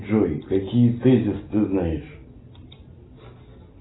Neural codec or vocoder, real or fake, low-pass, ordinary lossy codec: none; real; 7.2 kHz; AAC, 16 kbps